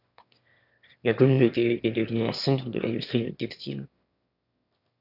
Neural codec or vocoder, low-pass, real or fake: autoencoder, 22.05 kHz, a latent of 192 numbers a frame, VITS, trained on one speaker; 5.4 kHz; fake